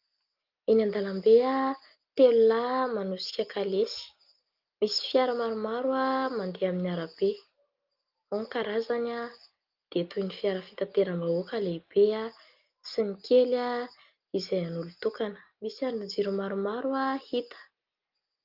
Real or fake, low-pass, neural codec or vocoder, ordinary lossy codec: real; 5.4 kHz; none; Opus, 32 kbps